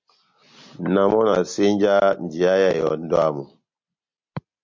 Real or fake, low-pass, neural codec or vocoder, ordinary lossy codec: real; 7.2 kHz; none; MP3, 48 kbps